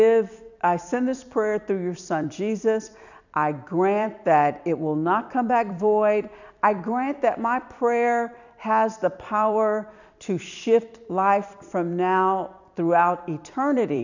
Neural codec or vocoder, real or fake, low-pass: vocoder, 44.1 kHz, 128 mel bands every 256 samples, BigVGAN v2; fake; 7.2 kHz